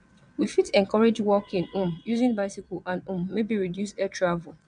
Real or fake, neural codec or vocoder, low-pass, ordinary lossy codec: fake; vocoder, 22.05 kHz, 80 mel bands, WaveNeXt; 9.9 kHz; none